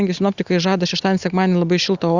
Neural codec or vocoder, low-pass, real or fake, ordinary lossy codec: none; 7.2 kHz; real; Opus, 64 kbps